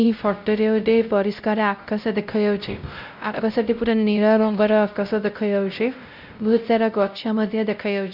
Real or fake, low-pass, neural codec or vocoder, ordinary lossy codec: fake; 5.4 kHz; codec, 16 kHz, 0.5 kbps, X-Codec, WavLM features, trained on Multilingual LibriSpeech; none